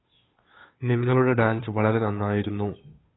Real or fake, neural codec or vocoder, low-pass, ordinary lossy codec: fake; codec, 16 kHz, 2 kbps, FunCodec, trained on Chinese and English, 25 frames a second; 7.2 kHz; AAC, 16 kbps